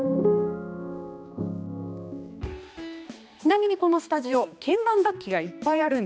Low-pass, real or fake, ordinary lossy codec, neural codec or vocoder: none; fake; none; codec, 16 kHz, 2 kbps, X-Codec, HuBERT features, trained on balanced general audio